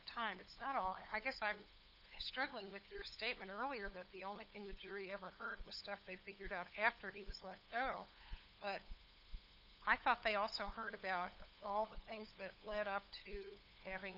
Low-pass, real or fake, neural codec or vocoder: 5.4 kHz; fake; codec, 16 kHz, 8 kbps, FunCodec, trained on LibriTTS, 25 frames a second